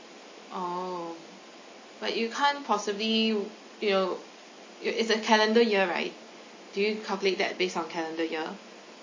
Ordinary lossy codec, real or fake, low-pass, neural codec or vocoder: MP3, 32 kbps; real; 7.2 kHz; none